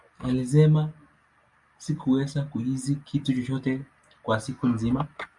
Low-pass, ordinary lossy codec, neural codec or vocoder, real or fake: 10.8 kHz; Opus, 64 kbps; none; real